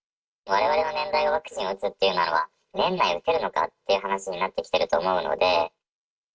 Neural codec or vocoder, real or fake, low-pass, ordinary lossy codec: none; real; none; none